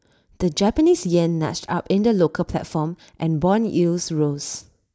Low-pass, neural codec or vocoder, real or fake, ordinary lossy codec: none; none; real; none